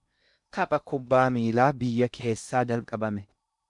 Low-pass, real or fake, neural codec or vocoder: 10.8 kHz; fake; codec, 16 kHz in and 24 kHz out, 0.6 kbps, FocalCodec, streaming, 2048 codes